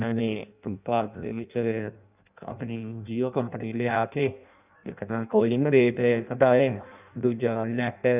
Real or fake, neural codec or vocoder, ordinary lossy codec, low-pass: fake; codec, 16 kHz in and 24 kHz out, 0.6 kbps, FireRedTTS-2 codec; none; 3.6 kHz